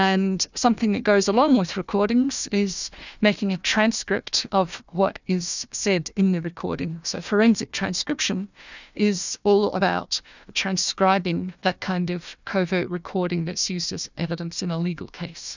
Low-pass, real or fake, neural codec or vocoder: 7.2 kHz; fake; codec, 16 kHz, 1 kbps, FunCodec, trained on Chinese and English, 50 frames a second